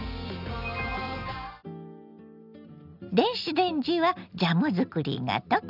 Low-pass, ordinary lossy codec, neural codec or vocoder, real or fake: 5.4 kHz; none; none; real